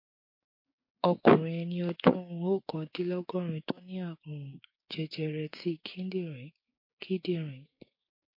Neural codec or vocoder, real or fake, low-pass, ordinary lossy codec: codec, 16 kHz, 6 kbps, DAC; fake; 5.4 kHz; MP3, 32 kbps